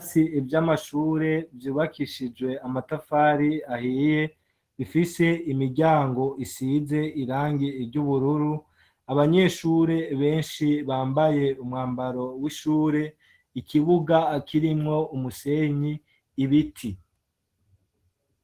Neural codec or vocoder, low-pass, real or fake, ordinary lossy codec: none; 14.4 kHz; real; Opus, 16 kbps